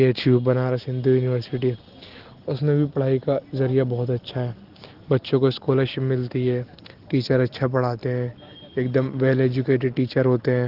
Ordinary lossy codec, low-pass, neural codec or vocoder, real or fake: Opus, 16 kbps; 5.4 kHz; none; real